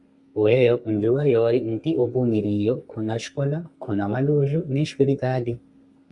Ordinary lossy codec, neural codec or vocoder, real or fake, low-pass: Opus, 64 kbps; codec, 32 kHz, 1.9 kbps, SNAC; fake; 10.8 kHz